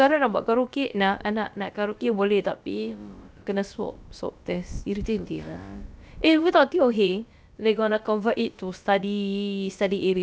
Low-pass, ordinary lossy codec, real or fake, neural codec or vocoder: none; none; fake; codec, 16 kHz, about 1 kbps, DyCAST, with the encoder's durations